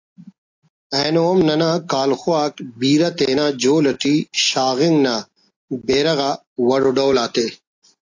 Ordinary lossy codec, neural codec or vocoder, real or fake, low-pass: AAC, 48 kbps; none; real; 7.2 kHz